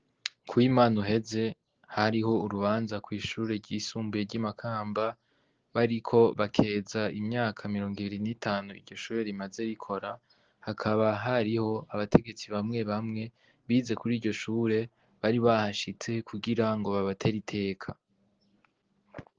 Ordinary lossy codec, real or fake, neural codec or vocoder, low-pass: Opus, 16 kbps; real; none; 7.2 kHz